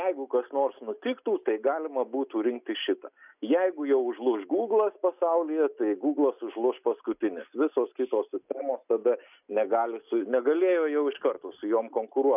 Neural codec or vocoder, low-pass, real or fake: none; 3.6 kHz; real